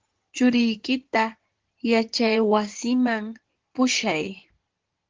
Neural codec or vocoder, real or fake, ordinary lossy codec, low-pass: codec, 16 kHz in and 24 kHz out, 2.2 kbps, FireRedTTS-2 codec; fake; Opus, 16 kbps; 7.2 kHz